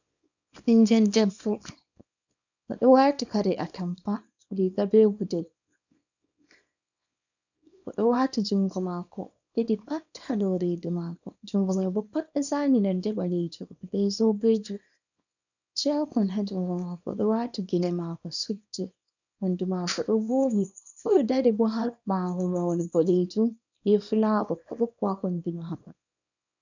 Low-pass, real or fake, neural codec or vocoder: 7.2 kHz; fake; codec, 24 kHz, 0.9 kbps, WavTokenizer, small release